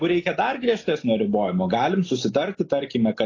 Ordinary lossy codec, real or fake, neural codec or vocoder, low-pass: AAC, 32 kbps; real; none; 7.2 kHz